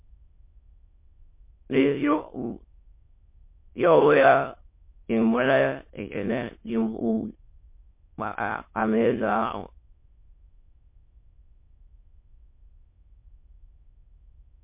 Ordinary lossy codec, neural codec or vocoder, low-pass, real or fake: MP3, 24 kbps; autoencoder, 22.05 kHz, a latent of 192 numbers a frame, VITS, trained on many speakers; 3.6 kHz; fake